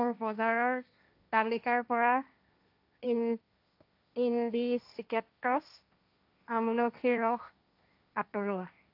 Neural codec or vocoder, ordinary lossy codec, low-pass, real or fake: codec, 16 kHz, 1.1 kbps, Voila-Tokenizer; AAC, 48 kbps; 5.4 kHz; fake